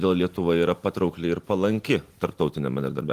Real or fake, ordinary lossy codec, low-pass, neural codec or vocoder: real; Opus, 24 kbps; 14.4 kHz; none